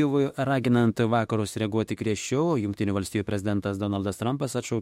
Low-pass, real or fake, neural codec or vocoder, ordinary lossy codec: 19.8 kHz; fake; autoencoder, 48 kHz, 32 numbers a frame, DAC-VAE, trained on Japanese speech; MP3, 64 kbps